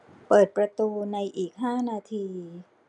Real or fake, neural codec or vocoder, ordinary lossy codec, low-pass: real; none; none; 10.8 kHz